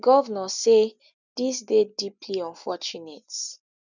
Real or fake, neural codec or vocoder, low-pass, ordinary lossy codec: real; none; 7.2 kHz; none